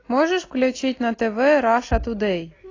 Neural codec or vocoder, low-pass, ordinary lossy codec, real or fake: none; 7.2 kHz; AAC, 32 kbps; real